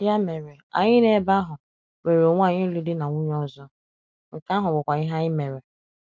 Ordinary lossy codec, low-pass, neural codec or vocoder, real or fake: none; none; none; real